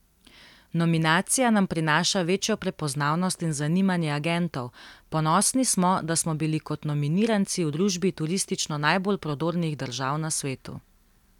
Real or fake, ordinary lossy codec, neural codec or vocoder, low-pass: real; none; none; 19.8 kHz